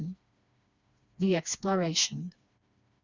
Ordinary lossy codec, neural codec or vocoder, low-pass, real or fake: Opus, 64 kbps; codec, 16 kHz, 2 kbps, FreqCodec, smaller model; 7.2 kHz; fake